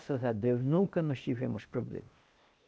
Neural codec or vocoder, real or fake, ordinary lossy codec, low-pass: codec, 16 kHz, 0.8 kbps, ZipCodec; fake; none; none